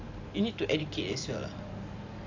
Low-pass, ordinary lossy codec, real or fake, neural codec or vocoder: 7.2 kHz; AAC, 48 kbps; real; none